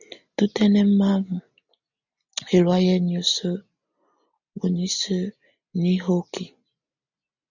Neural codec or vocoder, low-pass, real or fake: none; 7.2 kHz; real